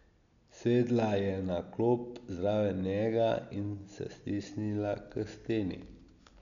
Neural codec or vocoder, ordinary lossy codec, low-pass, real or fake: none; none; 7.2 kHz; real